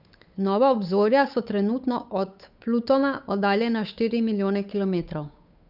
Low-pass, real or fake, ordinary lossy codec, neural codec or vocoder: 5.4 kHz; fake; none; codec, 16 kHz, 8 kbps, FunCodec, trained on Chinese and English, 25 frames a second